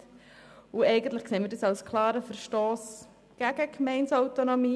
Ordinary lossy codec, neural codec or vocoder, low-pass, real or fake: none; none; none; real